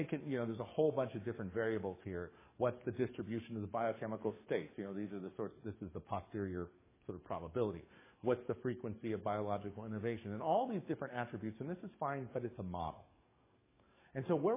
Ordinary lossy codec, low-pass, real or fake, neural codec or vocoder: MP3, 16 kbps; 3.6 kHz; fake; codec, 44.1 kHz, 7.8 kbps, DAC